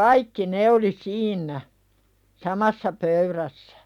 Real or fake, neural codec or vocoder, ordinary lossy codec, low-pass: real; none; none; 19.8 kHz